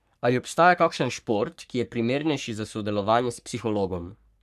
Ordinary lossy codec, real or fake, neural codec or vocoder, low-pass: none; fake; codec, 44.1 kHz, 3.4 kbps, Pupu-Codec; 14.4 kHz